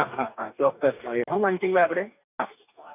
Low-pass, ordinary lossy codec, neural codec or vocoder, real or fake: 3.6 kHz; none; codec, 44.1 kHz, 2.6 kbps, DAC; fake